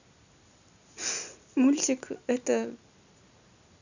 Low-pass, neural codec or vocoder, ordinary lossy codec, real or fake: 7.2 kHz; none; none; real